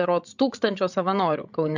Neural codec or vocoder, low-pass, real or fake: codec, 16 kHz, 16 kbps, FreqCodec, larger model; 7.2 kHz; fake